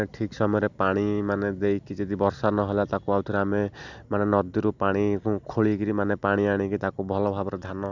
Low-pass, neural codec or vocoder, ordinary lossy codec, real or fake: 7.2 kHz; none; none; real